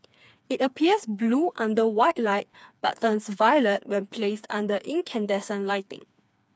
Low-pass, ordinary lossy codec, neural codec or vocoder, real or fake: none; none; codec, 16 kHz, 4 kbps, FreqCodec, smaller model; fake